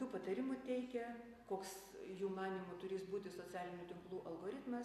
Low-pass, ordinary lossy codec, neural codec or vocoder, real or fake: 14.4 kHz; MP3, 96 kbps; none; real